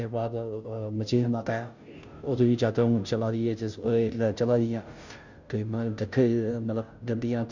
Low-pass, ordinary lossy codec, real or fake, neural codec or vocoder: 7.2 kHz; Opus, 64 kbps; fake; codec, 16 kHz, 0.5 kbps, FunCodec, trained on Chinese and English, 25 frames a second